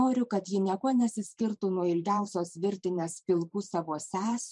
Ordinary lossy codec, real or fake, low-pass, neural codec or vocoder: MP3, 96 kbps; fake; 10.8 kHz; vocoder, 44.1 kHz, 128 mel bands every 512 samples, BigVGAN v2